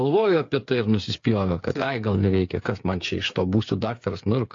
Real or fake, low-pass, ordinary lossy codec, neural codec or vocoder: fake; 7.2 kHz; AAC, 48 kbps; codec, 16 kHz, 8 kbps, FreqCodec, smaller model